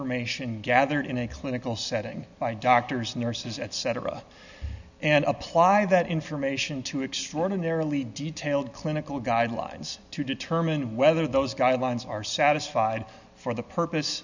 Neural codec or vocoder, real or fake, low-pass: none; real; 7.2 kHz